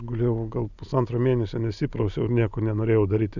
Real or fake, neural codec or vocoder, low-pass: real; none; 7.2 kHz